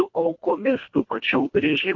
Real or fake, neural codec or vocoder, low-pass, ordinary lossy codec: fake; codec, 24 kHz, 1.5 kbps, HILCodec; 7.2 kHz; MP3, 48 kbps